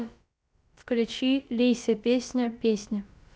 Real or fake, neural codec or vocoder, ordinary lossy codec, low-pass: fake; codec, 16 kHz, about 1 kbps, DyCAST, with the encoder's durations; none; none